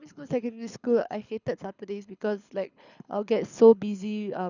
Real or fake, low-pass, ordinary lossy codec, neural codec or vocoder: fake; 7.2 kHz; none; codec, 24 kHz, 6 kbps, HILCodec